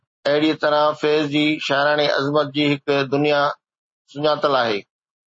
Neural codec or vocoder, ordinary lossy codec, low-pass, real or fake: none; MP3, 32 kbps; 9.9 kHz; real